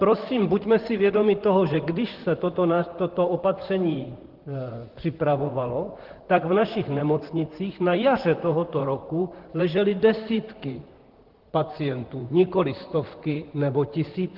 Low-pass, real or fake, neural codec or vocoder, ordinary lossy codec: 5.4 kHz; fake; vocoder, 44.1 kHz, 128 mel bands, Pupu-Vocoder; Opus, 16 kbps